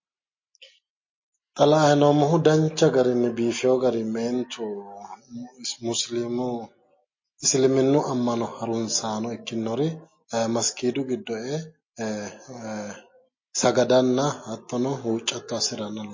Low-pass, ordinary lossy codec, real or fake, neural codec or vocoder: 7.2 kHz; MP3, 32 kbps; real; none